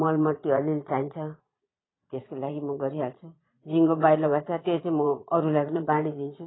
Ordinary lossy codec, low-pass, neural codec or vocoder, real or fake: AAC, 16 kbps; 7.2 kHz; vocoder, 22.05 kHz, 80 mel bands, Vocos; fake